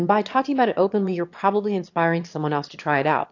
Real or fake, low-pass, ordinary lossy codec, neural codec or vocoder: fake; 7.2 kHz; AAC, 48 kbps; autoencoder, 22.05 kHz, a latent of 192 numbers a frame, VITS, trained on one speaker